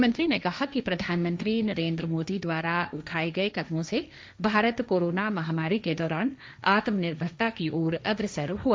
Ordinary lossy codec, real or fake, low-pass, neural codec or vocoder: none; fake; none; codec, 16 kHz, 1.1 kbps, Voila-Tokenizer